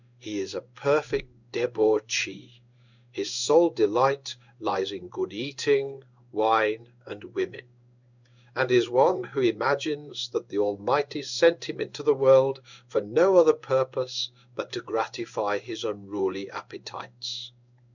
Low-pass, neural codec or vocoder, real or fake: 7.2 kHz; codec, 16 kHz in and 24 kHz out, 1 kbps, XY-Tokenizer; fake